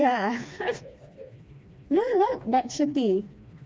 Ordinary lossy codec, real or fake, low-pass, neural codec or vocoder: none; fake; none; codec, 16 kHz, 2 kbps, FreqCodec, smaller model